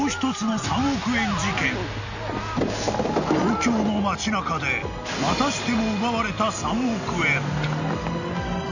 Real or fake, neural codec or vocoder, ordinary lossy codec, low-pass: real; none; none; 7.2 kHz